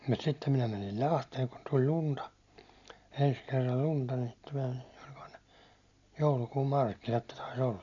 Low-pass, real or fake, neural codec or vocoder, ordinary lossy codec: 7.2 kHz; real; none; none